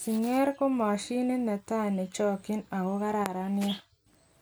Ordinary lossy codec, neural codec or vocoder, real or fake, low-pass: none; none; real; none